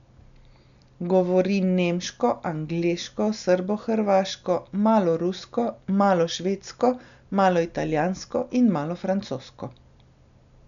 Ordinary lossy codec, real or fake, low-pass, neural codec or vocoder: none; real; 7.2 kHz; none